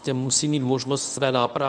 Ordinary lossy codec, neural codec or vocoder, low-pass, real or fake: MP3, 96 kbps; codec, 24 kHz, 0.9 kbps, WavTokenizer, medium speech release version 1; 9.9 kHz; fake